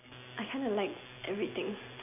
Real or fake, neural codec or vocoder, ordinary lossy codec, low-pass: real; none; none; 3.6 kHz